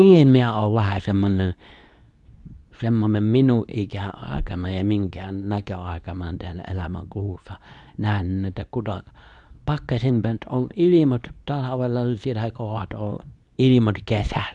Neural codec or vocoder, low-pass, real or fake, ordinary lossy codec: codec, 24 kHz, 0.9 kbps, WavTokenizer, medium speech release version 2; 10.8 kHz; fake; none